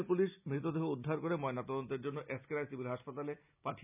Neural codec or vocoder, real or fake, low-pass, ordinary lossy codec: none; real; 3.6 kHz; none